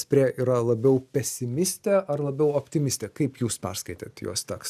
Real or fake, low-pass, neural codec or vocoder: real; 14.4 kHz; none